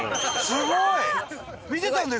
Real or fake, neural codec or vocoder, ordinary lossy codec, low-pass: real; none; none; none